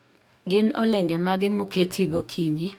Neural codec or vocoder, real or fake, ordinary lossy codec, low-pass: codec, 44.1 kHz, 2.6 kbps, DAC; fake; none; 19.8 kHz